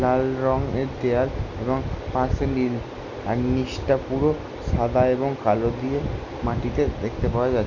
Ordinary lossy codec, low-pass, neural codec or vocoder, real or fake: none; 7.2 kHz; none; real